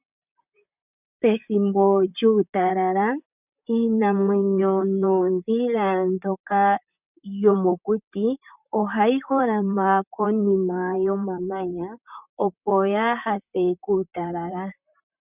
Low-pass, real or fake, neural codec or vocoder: 3.6 kHz; fake; vocoder, 44.1 kHz, 128 mel bands, Pupu-Vocoder